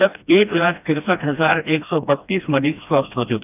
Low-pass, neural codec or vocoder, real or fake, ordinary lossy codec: 3.6 kHz; codec, 16 kHz, 1 kbps, FreqCodec, smaller model; fake; none